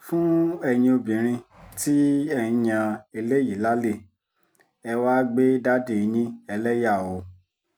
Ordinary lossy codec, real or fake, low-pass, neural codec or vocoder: none; real; none; none